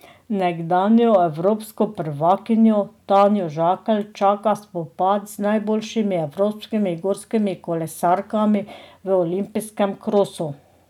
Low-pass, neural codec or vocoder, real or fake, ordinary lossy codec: 19.8 kHz; none; real; none